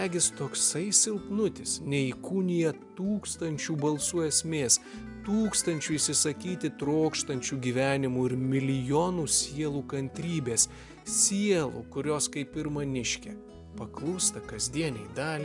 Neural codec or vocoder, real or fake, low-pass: none; real; 10.8 kHz